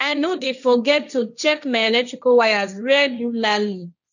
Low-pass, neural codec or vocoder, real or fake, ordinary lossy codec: 7.2 kHz; codec, 16 kHz, 1.1 kbps, Voila-Tokenizer; fake; none